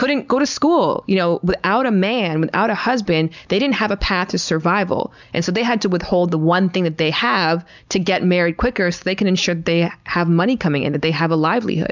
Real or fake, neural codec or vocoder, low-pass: real; none; 7.2 kHz